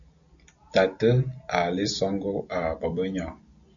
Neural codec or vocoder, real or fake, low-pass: none; real; 7.2 kHz